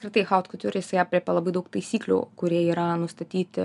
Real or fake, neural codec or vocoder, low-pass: real; none; 10.8 kHz